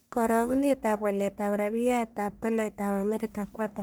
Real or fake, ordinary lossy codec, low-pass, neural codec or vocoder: fake; none; none; codec, 44.1 kHz, 1.7 kbps, Pupu-Codec